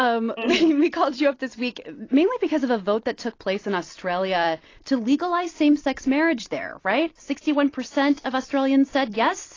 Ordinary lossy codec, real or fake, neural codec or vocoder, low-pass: AAC, 32 kbps; fake; vocoder, 44.1 kHz, 128 mel bands every 256 samples, BigVGAN v2; 7.2 kHz